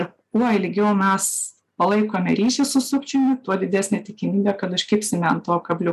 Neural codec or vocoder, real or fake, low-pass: none; real; 14.4 kHz